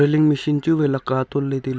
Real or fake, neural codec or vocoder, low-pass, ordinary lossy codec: real; none; none; none